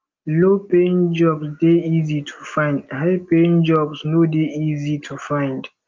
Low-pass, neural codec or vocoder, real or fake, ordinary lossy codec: 7.2 kHz; none; real; Opus, 24 kbps